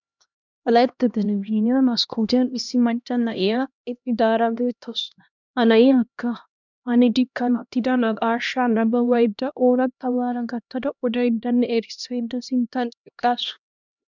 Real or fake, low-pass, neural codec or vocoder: fake; 7.2 kHz; codec, 16 kHz, 1 kbps, X-Codec, HuBERT features, trained on LibriSpeech